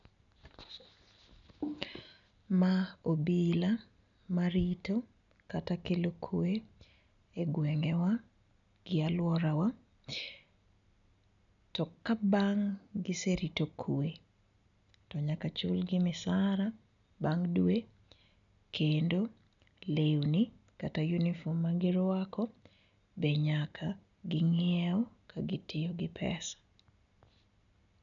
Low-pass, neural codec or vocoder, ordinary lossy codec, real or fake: 7.2 kHz; none; none; real